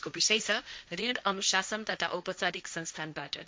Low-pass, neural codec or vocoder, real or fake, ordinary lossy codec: none; codec, 16 kHz, 1.1 kbps, Voila-Tokenizer; fake; none